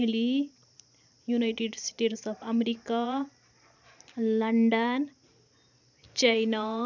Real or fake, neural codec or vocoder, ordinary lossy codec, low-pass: fake; vocoder, 44.1 kHz, 128 mel bands every 512 samples, BigVGAN v2; none; 7.2 kHz